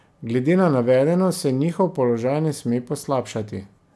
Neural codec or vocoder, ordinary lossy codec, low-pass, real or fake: none; none; none; real